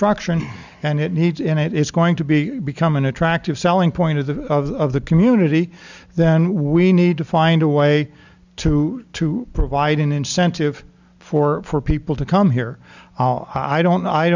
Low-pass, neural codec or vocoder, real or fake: 7.2 kHz; none; real